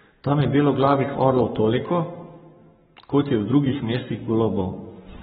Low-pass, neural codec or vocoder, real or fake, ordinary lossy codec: 19.8 kHz; codec, 44.1 kHz, 7.8 kbps, Pupu-Codec; fake; AAC, 16 kbps